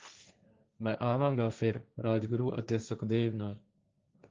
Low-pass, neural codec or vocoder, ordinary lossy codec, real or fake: 7.2 kHz; codec, 16 kHz, 1.1 kbps, Voila-Tokenizer; Opus, 24 kbps; fake